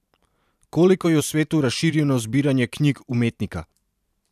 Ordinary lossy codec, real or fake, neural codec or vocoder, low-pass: none; fake; vocoder, 44.1 kHz, 128 mel bands every 512 samples, BigVGAN v2; 14.4 kHz